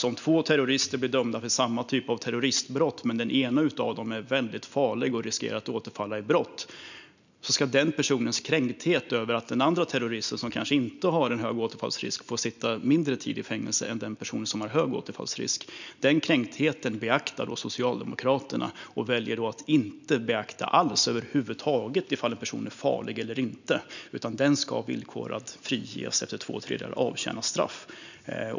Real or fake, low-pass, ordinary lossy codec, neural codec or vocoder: real; 7.2 kHz; none; none